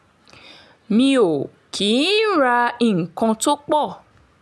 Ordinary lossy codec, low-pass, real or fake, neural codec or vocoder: none; none; real; none